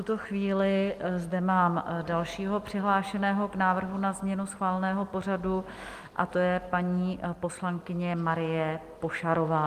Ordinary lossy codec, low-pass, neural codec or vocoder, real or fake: Opus, 32 kbps; 14.4 kHz; none; real